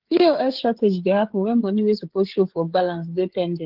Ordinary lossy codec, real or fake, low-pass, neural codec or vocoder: Opus, 16 kbps; fake; 5.4 kHz; codec, 16 kHz, 16 kbps, FreqCodec, smaller model